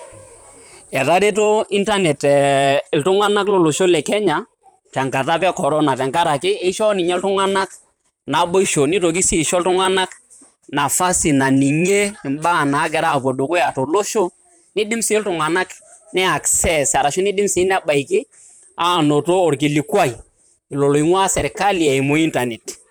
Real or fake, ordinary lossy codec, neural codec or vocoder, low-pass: fake; none; vocoder, 44.1 kHz, 128 mel bands, Pupu-Vocoder; none